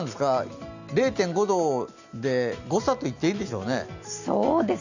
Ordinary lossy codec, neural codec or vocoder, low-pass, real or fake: none; none; 7.2 kHz; real